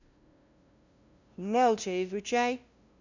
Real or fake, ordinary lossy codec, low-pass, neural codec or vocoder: fake; none; 7.2 kHz; codec, 16 kHz, 0.5 kbps, FunCodec, trained on LibriTTS, 25 frames a second